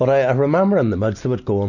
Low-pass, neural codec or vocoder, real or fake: 7.2 kHz; none; real